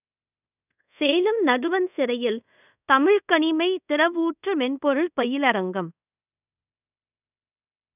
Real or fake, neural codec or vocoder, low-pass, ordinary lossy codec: fake; codec, 24 kHz, 0.5 kbps, DualCodec; 3.6 kHz; none